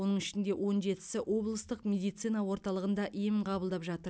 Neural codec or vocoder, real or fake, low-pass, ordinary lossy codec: none; real; none; none